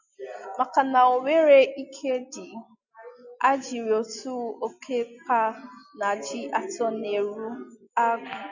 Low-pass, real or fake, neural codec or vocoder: 7.2 kHz; real; none